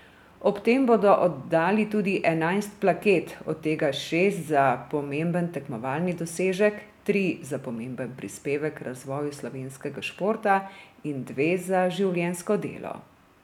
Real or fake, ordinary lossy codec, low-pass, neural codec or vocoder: real; none; 19.8 kHz; none